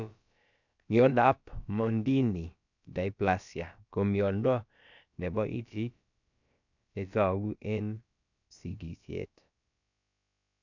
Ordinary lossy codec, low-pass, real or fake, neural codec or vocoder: none; 7.2 kHz; fake; codec, 16 kHz, about 1 kbps, DyCAST, with the encoder's durations